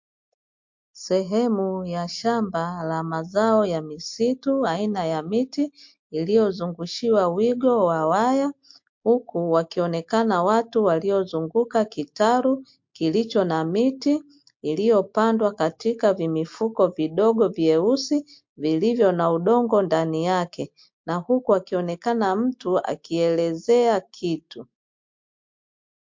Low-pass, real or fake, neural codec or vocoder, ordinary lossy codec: 7.2 kHz; real; none; MP3, 48 kbps